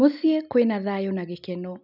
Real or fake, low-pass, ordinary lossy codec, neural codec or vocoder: real; 5.4 kHz; none; none